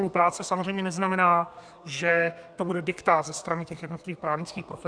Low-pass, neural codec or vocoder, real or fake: 9.9 kHz; codec, 32 kHz, 1.9 kbps, SNAC; fake